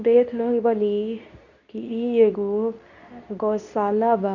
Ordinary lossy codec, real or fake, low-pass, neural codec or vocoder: none; fake; 7.2 kHz; codec, 24 kHz, 0.9 kbps, WavTokenizer, medium speech release version 2